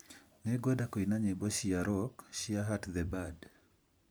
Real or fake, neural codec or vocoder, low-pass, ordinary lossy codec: fake; vocoder, 44.1 kHz, 128 mel bands every 256 samples, BigVGAN v2; none; none